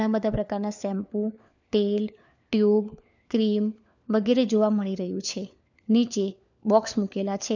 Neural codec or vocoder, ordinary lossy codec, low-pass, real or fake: codec, 16 kHz, 4 kbps, FunCodec, trained on LibriTTS, 50 frames a second; none; 7.2 kHz; fake